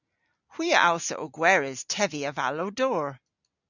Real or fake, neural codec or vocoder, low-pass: real; none; 7.2 kHz